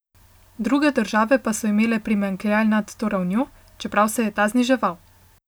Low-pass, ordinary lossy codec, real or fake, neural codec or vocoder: none; none; real; none